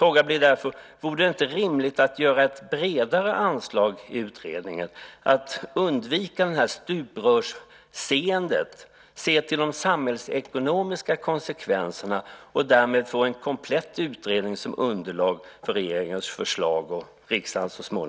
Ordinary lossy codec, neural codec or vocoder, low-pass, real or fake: none; none; none; real